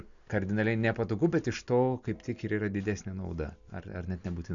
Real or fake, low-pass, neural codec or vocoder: real; 7.2 kHz; none